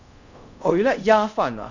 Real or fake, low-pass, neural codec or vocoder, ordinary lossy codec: fake; 7.2 kHz; codec, 24 kHz, 0.5 kbps, DualCodec; none